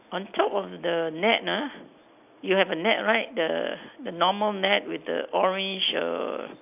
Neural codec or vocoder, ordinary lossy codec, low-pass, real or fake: none; none; 3.6 kHz; real